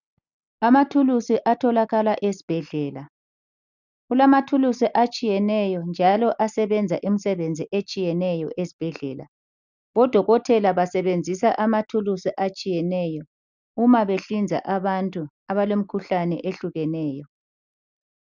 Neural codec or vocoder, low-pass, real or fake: none; 7.2 kHz; real